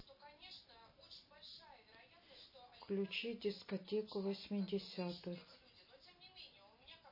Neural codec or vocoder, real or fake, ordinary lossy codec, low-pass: none; real; MP3, 24 kbps; 5.4 kHz